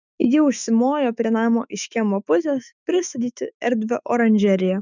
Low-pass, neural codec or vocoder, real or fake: 7.2 kHz; autoencoder, 48 kHz, 128 numbers a frame, DAC-VAE, trained on Japanese speech; fake